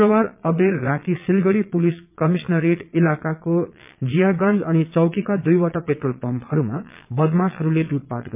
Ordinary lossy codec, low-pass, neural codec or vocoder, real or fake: MP3, 32 kbps; 3.6 kHz; vocoder, 22.05 kHz, 80 mel bands, Vocos; fake